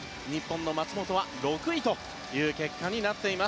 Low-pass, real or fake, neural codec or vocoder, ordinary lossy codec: none; real; none; none